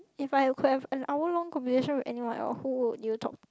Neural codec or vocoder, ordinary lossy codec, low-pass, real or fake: none; none; none; real